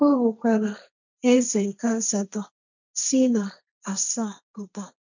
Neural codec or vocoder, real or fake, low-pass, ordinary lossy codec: codec, 16 kHz, 1.1 kbps, Voila-Tokenizer; fake; 7.2 kHz; none